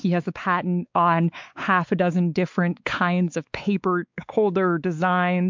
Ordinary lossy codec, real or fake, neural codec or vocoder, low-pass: MP3, 64 kbps; fake; codec, 16 kHz, 4 kbps, X-Codec, WavLM features, trained on Multilingual LibriSpeech; 7.2 kHz